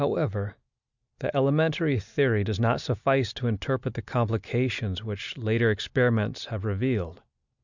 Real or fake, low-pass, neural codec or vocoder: real; 7.2 kHz; none